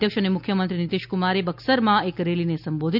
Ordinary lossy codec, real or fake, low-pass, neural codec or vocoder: none; real; 5.4 kHz; none